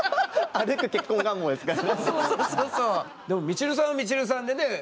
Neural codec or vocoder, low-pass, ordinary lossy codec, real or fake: none; none; none; real